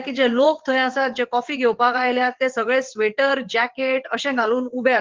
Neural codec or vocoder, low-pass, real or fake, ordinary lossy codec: vocoder, 22.05 kHz, 80 mel bands, WaveNeXt; 7.2 kHz; fake; Opus, 16 kbps